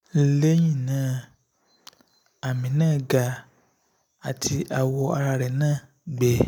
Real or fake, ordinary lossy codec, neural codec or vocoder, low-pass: real; none; none; none